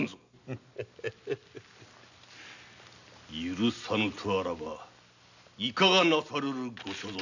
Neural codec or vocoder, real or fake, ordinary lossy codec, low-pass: none; real; none; 7.2 kHz